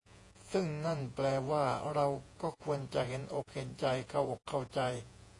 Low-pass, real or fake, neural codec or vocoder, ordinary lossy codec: 10.8 kHz; fake; vocoder, 48 kHz, 128 mel bands, Vocos; AAC, 48 kbps